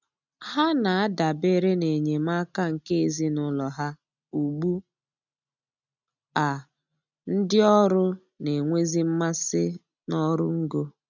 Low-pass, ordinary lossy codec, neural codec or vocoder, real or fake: 7.2 kHz; none; none; real